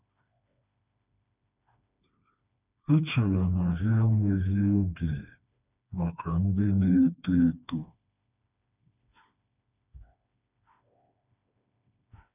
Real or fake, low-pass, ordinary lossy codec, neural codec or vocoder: fake; 3.6 kHz; none; codec, 16 kHz, 2 kbps, FreqCodec, smaller model